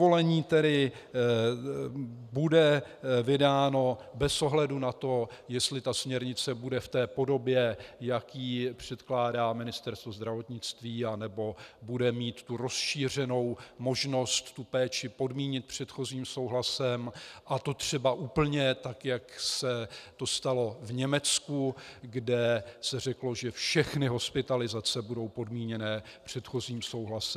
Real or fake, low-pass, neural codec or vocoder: real; 14.4 kHz; none